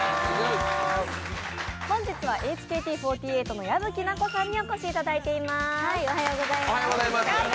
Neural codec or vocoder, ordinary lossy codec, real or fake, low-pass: none; none; real; none